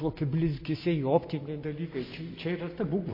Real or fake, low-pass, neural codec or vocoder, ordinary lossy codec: real; 5.4 kHz; none; MP3, 24 kbps